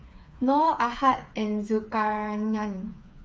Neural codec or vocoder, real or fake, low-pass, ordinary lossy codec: codec, 16 kHz, 4 kbps, FreqCodec, smaller model; fake; none; none